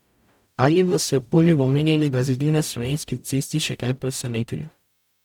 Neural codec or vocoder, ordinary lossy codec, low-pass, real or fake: codec, 44.1 kHz, 0.9 kbps, DAC; none; 19.8 kHz; fake